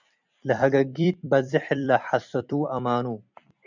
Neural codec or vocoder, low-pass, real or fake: vocoder, 44.1 kHz, 128 mel bands every 256 samples, BigVGAN v2; 7.2 kHz; fake